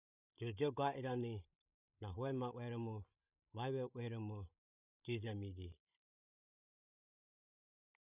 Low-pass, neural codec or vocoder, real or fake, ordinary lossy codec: 3.6 kHz; codec, 16 kHz, 16 kbps, FreqCodec, larger model; fake; none